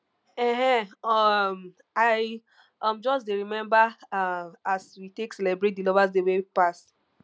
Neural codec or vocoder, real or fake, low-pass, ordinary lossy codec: none; real; none; none